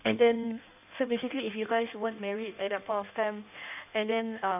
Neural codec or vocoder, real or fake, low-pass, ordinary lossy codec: codec, 16 kHz in and 24 kHz out, 1.1 kbps, FireRedTTS-2 codec; fake; 3.6 kHz; none